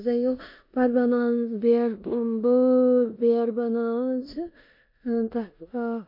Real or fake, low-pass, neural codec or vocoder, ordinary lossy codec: fake; 5.4 kHz; codec, 16 kHz in and 24 kHz out, 0.9 kbps, LongCat-Audio-Codec, four codebook decoder; MP3, 32 kbps